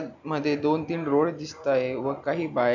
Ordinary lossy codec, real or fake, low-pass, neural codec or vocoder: none; real; 7.2 kHz; none